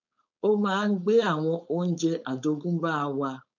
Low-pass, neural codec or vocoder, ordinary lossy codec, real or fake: 7.2 kHz; codec, 16 kHz, 4.8 kbps, FACodec; none; fake